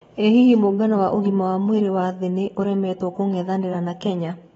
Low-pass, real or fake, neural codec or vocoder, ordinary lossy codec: 19.8 kHz; fake; vocoder, 44.1 kHz, 128 mel bands every 512 samples, BigVGAN v2; AAC, 24 kbps